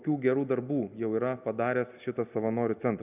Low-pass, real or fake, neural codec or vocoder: 3.6 kHz; real; none